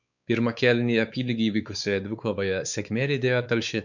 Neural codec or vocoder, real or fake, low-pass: codec, 16 kHz, 4 kbps, X-Codec, WavLM features, trained on Multilingual LibriSpeech; fake; 7.2 kHz